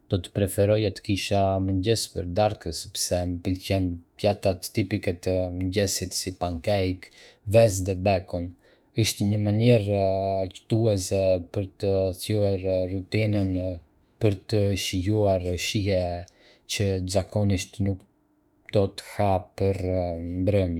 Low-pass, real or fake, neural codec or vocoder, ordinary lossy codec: 19.8 kHz; fake; autoencoder, 48 kHz, 32 numbers a frame, DAC-VAE, trained on Japanese speech; none